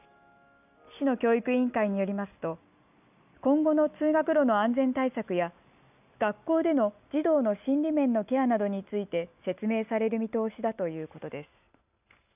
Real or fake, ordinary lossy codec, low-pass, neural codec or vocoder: real; none; 3.6 kHz; none